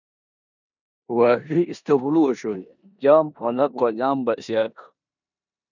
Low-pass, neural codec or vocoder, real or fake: 7.2 kHz; codec, 16 kHz in and 24 kHz out, 0.9 kbps, LongCat-Audio-Codec, four codebook decoder; fake